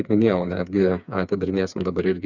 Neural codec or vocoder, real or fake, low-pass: codec, 16 kHz, 4 kbps, FreqCodec, smaller model; fake; 7.2 kHz